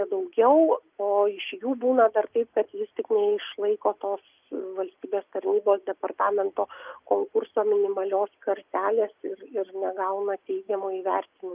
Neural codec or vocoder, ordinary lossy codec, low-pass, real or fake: none; Opus, 24 kbps; 3.6 kHz; real